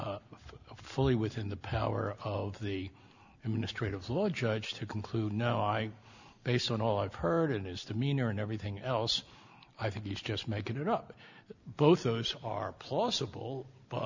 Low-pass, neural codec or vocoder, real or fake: 7.2 kHz; none; real